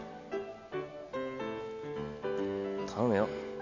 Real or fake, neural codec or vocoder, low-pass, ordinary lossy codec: real; none; 7.2 kHz; none